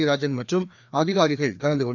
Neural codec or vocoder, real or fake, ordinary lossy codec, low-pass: codec, 16 kHz, 2 kbps, FreqCodec, larger model; fake; none; 7.2 kHz